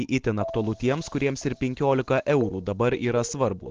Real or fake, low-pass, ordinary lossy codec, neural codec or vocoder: real; 7.2 kHz; Opus, 16 kbps; none